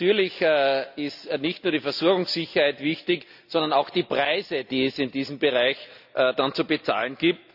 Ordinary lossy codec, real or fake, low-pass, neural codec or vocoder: none; real; 5.4 kHz; none